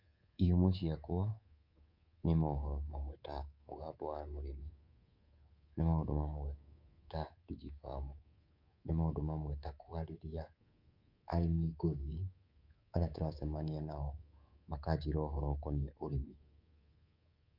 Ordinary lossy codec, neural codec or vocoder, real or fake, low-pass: Opus, 64 kbps; codec, 24 kHz, 3.1 kbps, DualCodec; fake; 5.4 kHz